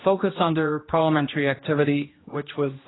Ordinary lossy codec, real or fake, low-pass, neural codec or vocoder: AAC, 16 kbps; fake; 7.2 kHz; codec, 16 kHz, 1 kbps, X-Codec, HuBERT features, trained on general audio